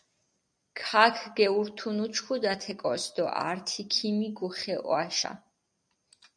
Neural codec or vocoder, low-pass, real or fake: none; 9.9 kHz; real